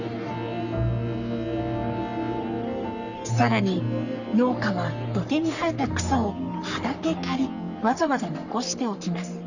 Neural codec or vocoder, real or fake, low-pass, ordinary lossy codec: codec, 44.1 kHz, 3.4 kbps, Pupu-Codec; fake; 7.2 kHz; none